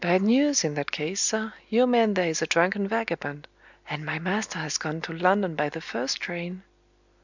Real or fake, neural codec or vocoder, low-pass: real; none; 7.2 kHz